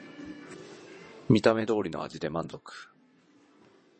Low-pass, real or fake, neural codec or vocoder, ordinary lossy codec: 9.9 kHz; fake; vocoder, 22.05 kHz, 80 mel bands, WaveNeXt; MP3, 32 kbps